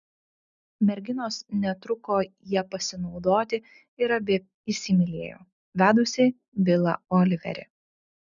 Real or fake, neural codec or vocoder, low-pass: real; none; 7.2 kHz